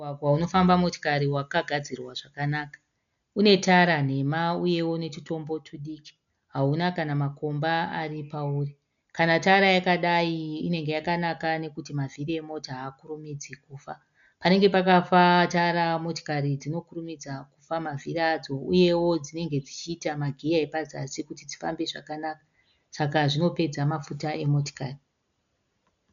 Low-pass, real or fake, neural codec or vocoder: 7.2 kHz; real; none